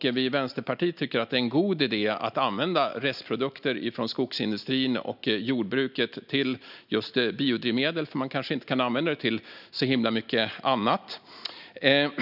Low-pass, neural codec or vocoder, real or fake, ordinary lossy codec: 5.4 kHz; none; real; none